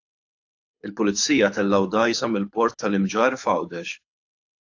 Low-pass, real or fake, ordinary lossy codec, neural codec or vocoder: 7.2 kHz; fake; AAC, 48 kbps; codec, 24 kHz, 6 kbps, HILCodec